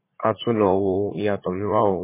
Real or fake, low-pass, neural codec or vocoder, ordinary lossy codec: fake; 3.6 kHz; vocoder, 44.1 kHz, 80 mel bands, Vocos; MP3, 16 kbps